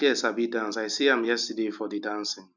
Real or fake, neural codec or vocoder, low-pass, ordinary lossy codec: real; none; 7.2 kHz; none